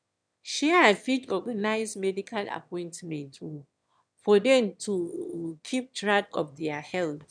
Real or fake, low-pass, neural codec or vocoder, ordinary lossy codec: fake; 9.9 kHz; autoencoder, 22.05 kHz, a latent of 192 numbers a frame, VITS, trained on one speaker; none